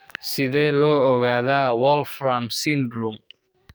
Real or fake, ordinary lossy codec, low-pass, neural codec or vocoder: fake; none; none; codec, 44.1 kHz, 2.6 kbps, SNAC